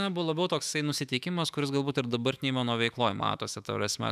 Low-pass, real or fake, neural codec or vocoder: 14.4 kHz; fake; autoencoder, 48 kHz, 128 numbers a frame, DAC-VAE, trained on Japanese speech